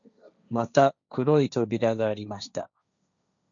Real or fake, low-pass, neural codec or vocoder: fake; 7.2 kHz; codec, 16 kHz, 1.1 kbps, Voila-Tokenizer